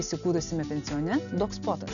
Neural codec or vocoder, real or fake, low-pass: none; real; 7.2 kHz